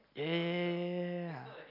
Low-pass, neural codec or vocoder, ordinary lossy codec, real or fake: 5.4 kHz; none; AAC, 48 kbps; real